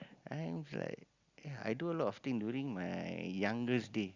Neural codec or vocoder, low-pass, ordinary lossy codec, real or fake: none; 7.2 kHz; Opus, 64 kbps; real